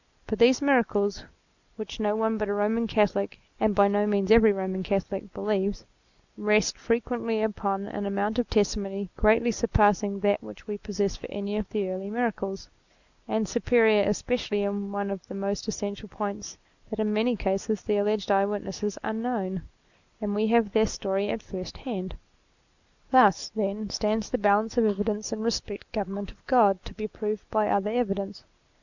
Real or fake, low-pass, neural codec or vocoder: real; 7.2 kHz; none